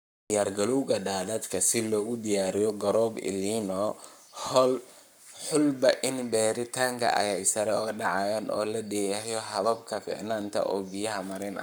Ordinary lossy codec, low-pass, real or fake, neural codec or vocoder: none; none; fake; codec, 44.1 kHz, 7.8 kbps, Pupu-Codec